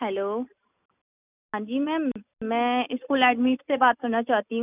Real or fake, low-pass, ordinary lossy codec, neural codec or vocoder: real; 3.6 kHz; none; none